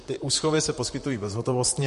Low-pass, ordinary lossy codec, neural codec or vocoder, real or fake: 14.4 kHz; MP3, 48 kbps; vocoder, 44.1 kHz, 128 mel bands, Pupu-Vocoder; fake